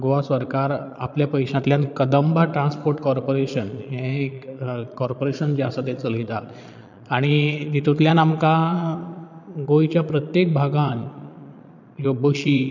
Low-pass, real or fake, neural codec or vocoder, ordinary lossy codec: 7.2 kHz; fake; vocoder, 22.05 kHz, 80 mel bands, Vocos; none